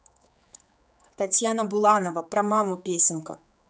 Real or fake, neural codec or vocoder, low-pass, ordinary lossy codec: fake; codec, 16 kHz, 4 kbps, X-Codec, HuBERT features, trained on general audio; none; none